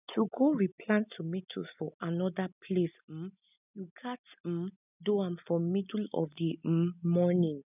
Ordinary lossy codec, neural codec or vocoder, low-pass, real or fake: none; none; 3.6 kHz; real